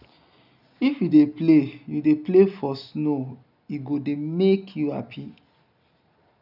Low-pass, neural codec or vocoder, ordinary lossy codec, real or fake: 5.4 kHz; none; none; real